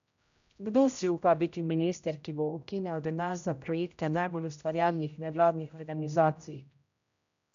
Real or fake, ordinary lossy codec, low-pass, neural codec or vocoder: fake; none; 7.2 kHz; codec, 16 kHz, 0.5 kbps, X-Codec, HuBERT features, trained on general audio